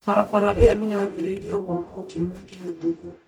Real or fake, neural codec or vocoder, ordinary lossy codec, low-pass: fake; codec, 44.1 kHz, 0.9 kbps, DAC; none; 19.8 kHz